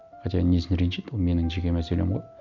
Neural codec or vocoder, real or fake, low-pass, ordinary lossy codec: none; real; 7.2 kHz; none